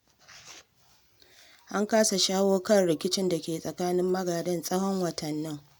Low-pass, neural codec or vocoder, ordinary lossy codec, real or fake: none; none; none; real